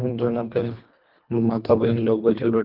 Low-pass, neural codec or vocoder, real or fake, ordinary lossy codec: 5.4 kHz; codec, 24 kHz, 1.5 kbps, HILCodec; fake; Opus, 24 kbps